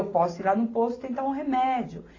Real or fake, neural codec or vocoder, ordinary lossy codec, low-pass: real; none; AAC, 32 kbps; 7.2 kHz